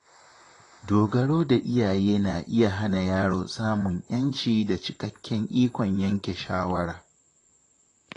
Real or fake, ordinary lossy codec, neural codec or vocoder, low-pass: fake; AAC, 32 kbps; vocoder, 44.1 kHz, 128 mel bands, Pupu-Vocoder; 10.8 kHz